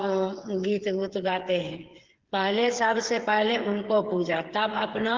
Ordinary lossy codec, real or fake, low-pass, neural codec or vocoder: Opus, 16 kbps; fake; 7.2 kHz; codec, 16 kHz, 8 kbps, FreqCodec, smaller model